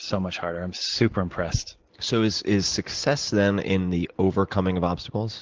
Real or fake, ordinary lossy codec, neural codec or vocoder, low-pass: real; Opus, 16 kbps; none; 7.2 kHz